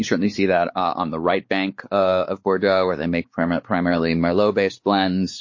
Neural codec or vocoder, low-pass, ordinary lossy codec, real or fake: codec, 16 kHz, 4 kbps, X-Codec, WavLM features, trained on Multilingual LibriSpeech; 7.2 kHz; MP3, 32 kbps; fake